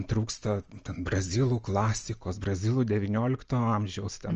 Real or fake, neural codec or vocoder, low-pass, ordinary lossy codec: real; none; 7.2 kHz; Opus, 24 kbps